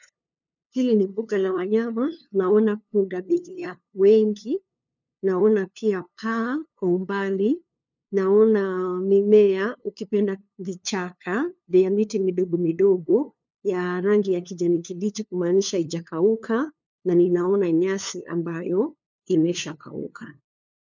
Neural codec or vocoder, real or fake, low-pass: codec, 16 kHz, 2 kbps, FunCodec, trained on LibriTTS, 25 frames a second; fake; 7.2 kHz